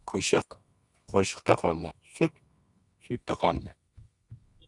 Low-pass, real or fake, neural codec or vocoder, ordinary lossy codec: 10.8 kHz; fake; codec, 24 kHz, 0.9 kbps, WavTokenizer, medium music audio release; Opus, 64 kbps